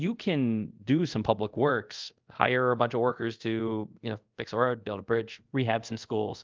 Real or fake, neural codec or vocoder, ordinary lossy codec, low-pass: fake; codec, 24 kHz, 0.5 kbps, DualCodec; Opus, 24 kbps; 7.2 kHz